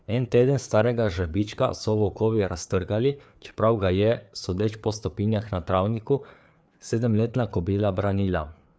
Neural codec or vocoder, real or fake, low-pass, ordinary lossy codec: codec, 16 kHz, 4 kbps, FreqCodec, larger model; fake; none; none